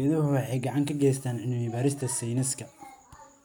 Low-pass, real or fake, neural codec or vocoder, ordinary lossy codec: none; real; none; none